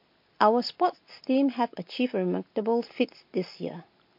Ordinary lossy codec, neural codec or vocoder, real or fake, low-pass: MP3, 32 kbps; none; real; 5.4 kHz